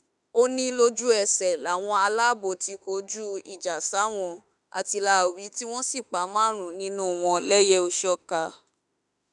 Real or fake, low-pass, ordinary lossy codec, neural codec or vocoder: fake; 10.8 kHz; none; autoencoder, 48 kHz, 32 numbers a frame, DAC-VAE, trained on Japanese speech